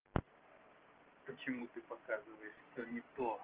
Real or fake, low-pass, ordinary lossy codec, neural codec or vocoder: real; 3.6 kHz; Opus, 16 kbps; none